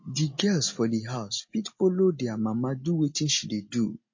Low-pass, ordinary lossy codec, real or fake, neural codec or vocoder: 7.2 kHz; MP3, 32 kbps; real; none